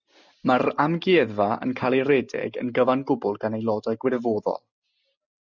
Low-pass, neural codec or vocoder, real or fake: 7.2 kHz; none; real